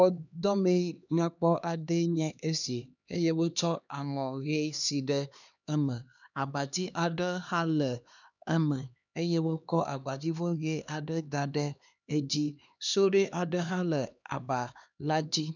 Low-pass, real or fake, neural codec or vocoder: 7.2 kHz; fake; codec, 16 kHz, 2 kbps, X-Codec, HuBERT features, trained on LibriSpeech